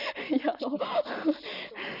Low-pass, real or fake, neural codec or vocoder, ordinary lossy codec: 5.4 kHz; real; none; none